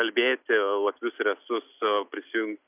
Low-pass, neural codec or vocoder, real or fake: 3.6 kHz; none; real